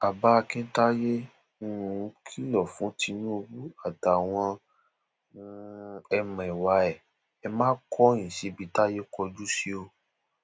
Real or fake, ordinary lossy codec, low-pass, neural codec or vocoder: real; none; none; none